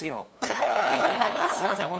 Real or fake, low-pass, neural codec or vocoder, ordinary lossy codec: fake; none; codec, 16 kHz, 2 kbps, FunCodec, trained on LibriTTS, 25 frames a second; none